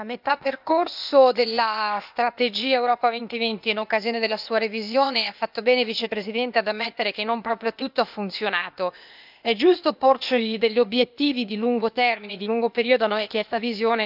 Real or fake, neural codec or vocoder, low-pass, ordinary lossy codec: fake; codec, 16 kHz, 0.8 kbps, ZipCodec; 5.4 kHz; none